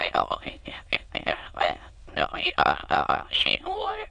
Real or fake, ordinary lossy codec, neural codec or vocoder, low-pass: fake; AAC, 64 kbps; autoencoder, 22.05 kHz, a latent of 192 numbers a frame, VITS, trained on many speakers; 9.9 kHz